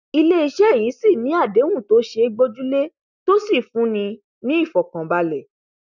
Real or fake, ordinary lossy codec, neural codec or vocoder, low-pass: real; none; none; 7.2 kHz